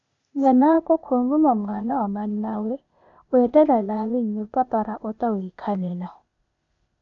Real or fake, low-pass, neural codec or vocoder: fake; 7.2 kHz; codec, 16 kHz, 0.8 kbps, ZipCodec